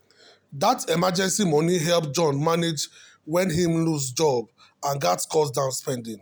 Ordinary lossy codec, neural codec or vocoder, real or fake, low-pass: none; none; real; none